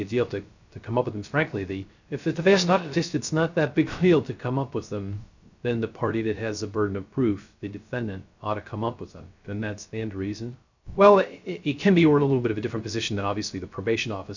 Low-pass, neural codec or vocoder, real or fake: 7.2 kHz; codec, 16 kHz, 0.3 kbps, FocalCodec; fake